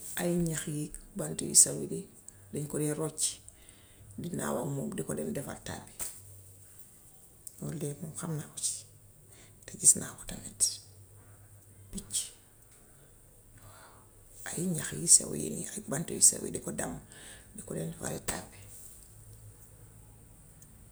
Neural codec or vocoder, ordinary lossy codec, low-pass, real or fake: none; none; none; real